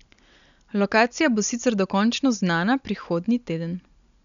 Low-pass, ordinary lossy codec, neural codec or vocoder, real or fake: 7.2 kHz; none; none; real